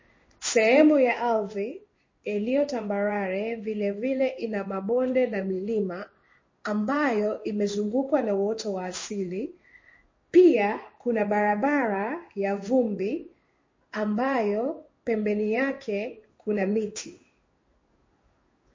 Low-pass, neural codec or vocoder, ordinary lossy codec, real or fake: 7.2 kHz; codec, 16 kHz in and 24 kHz out, 1 kbps, XY-Tokenizer; MP3, 32 kbps; fake